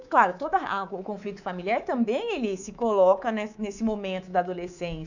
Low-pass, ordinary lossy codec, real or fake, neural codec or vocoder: 7.2 kHz; none; fake; codec, 24 kHz, 3.1 kbps, DualCodec